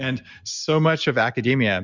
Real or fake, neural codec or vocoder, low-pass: real; none; 7.2 kHz